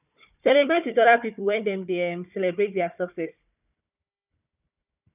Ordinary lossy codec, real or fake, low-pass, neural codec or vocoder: none; fake; 3.6 kHz; codec, 16 kHz, 4 kbps, FunCodec, trained on Chinese and English, 50 frames a second